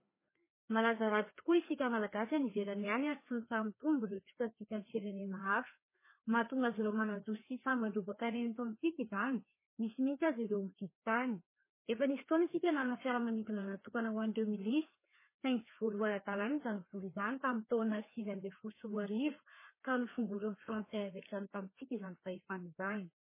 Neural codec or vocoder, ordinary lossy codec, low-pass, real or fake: codec, 16 kHz, 2 kbps, FreqCodec, larger model; MP3, 16 kbps; 3.6 kHz; fake